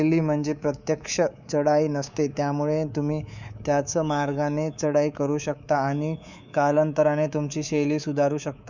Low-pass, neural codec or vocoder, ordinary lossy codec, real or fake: 7.2 kHz; codec, 24 kHz, 3.1 kbps, DualCodec; none; fake